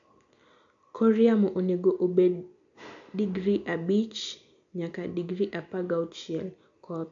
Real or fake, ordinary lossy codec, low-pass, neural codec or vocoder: real; AAC, 64 kbps; 7.2 kHz; none